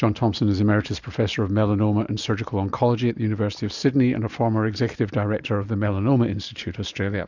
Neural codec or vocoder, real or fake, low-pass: none; real; 7.2 kHz